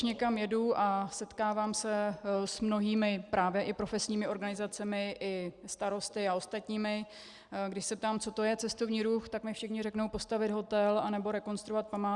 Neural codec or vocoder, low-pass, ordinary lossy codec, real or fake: none; 10.8 kHz; Opus, 64 kbps; real